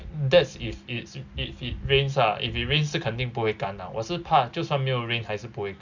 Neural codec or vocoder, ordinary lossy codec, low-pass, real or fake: none; none; 7.2 kHz; real